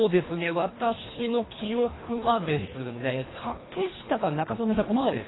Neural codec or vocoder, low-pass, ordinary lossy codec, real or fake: codec, 24 kHz, 1.5 kbps, HILCodec; 7.2 kHz; AAC, 16 kbps; fake